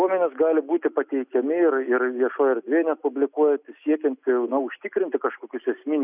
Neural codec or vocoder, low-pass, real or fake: none; 3.6 kHz; real